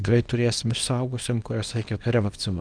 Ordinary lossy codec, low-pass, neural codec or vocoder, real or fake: Opus, 32 kbps; 9.9 kHz; codec, 24 kHz, 0.9 kbps, WavTokenizer, small release; fake